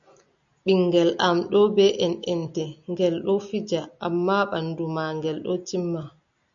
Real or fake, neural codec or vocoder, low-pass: real; none; 7.2 kHz